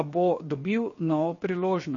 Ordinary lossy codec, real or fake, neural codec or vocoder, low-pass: MP3, 32 kbps; fake; codec, 16 kHz, 0.7 kbps, FocalCodec; 7.2 kHz